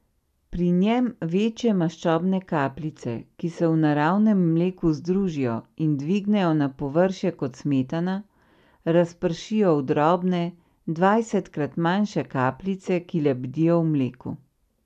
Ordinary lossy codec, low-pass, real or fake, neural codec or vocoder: MP3, 96 kbps; 14.4 kHz; real; none